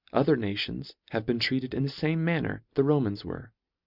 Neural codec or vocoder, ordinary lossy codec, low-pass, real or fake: none; Opus, 64 kbps; 5.4 kHz; real